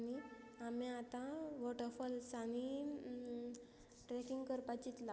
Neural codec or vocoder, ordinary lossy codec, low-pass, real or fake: none; none; none; real